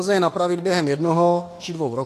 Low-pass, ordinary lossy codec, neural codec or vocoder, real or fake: 14.4 kHz; AAC, 48 kbps; autoencoder, 48 kHz, 32 numbers a frame, DAC-VAE, trained on Japanese speech; fake